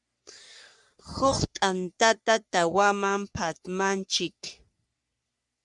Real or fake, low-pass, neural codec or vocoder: fake; 10.8 kHz; codec, 44.1 kHz, 3.4 kbps, Pupu-Codec